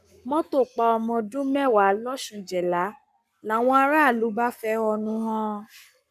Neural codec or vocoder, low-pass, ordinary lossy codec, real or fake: codec, 44.1 kHz, 7.8 kbps, Pupu-Codec; 14.4 kHz; none; fake